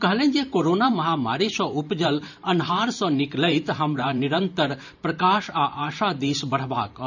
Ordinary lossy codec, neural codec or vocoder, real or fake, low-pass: none; vocoder, 44.1 kHz, 128 mel bands every 512 samples, BigVGAN v2; fake; 7.2 kHz